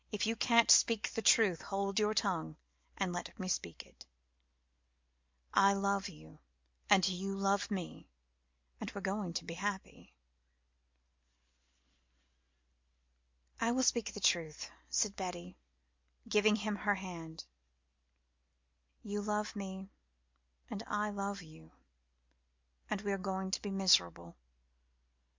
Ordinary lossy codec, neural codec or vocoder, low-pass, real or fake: MP3, 64 kbps; none; 7.2 kHz; real